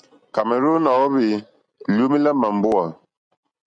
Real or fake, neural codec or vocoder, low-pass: real; none; 9.9 kHz